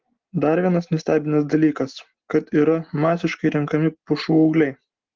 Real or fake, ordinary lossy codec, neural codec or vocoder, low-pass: real; Opus, 16 kbps; none; 7.2 kHz